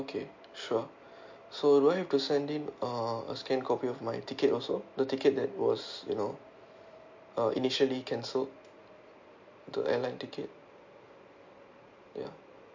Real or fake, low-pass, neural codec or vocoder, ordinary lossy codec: real; 7.2 kHz; none; MP3, 48 kbps